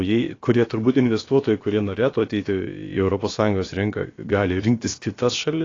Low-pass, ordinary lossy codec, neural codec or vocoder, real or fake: 7.2 kHz; AAC, 32 kbps; codec, 16 kHz, about 1 kbps, DyCAST, with the encoder's durations; fake